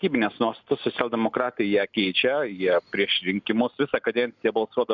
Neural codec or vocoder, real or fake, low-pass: none; real; 7.2 kHz